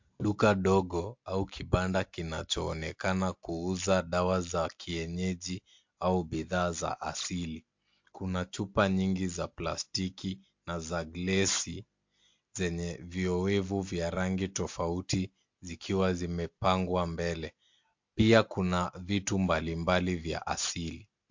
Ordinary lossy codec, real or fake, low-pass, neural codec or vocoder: MP3, 48 kbps; real; 7.2 kHz; none